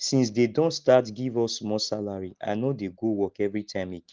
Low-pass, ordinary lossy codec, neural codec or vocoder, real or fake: 7.2 kHz; Opus, 16 kbps; none; real